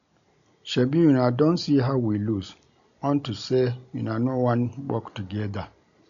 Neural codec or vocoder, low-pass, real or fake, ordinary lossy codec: none; 7.2 kHz; real; none